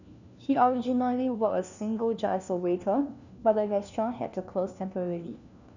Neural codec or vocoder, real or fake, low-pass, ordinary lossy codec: codec, 16 kHz, 1 kbps, FunCodec, trained on LibriTTS, 50 frames a second; fake; 7.2 kHz; none